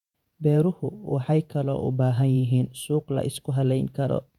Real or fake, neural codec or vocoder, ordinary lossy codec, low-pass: fake; vocoder, 48 kHz, 128 mel bands, Vocos; none; 19.8 kHz